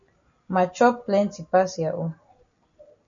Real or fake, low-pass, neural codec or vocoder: real; 7.2 kHz; none